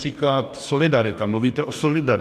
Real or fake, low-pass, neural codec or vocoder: fake; 14.4 kHz; codec, 44.1 kHz, 2.6 kbps, DAC